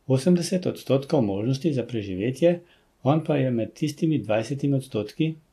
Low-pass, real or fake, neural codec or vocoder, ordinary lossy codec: 14.4 kHz; real; none; AAC, 64 kbps